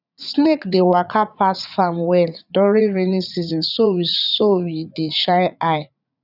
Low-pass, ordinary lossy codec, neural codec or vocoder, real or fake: 5.4 kHz; none; vocoder, 44.1 kHz, 80 mel bands, Vocos; fake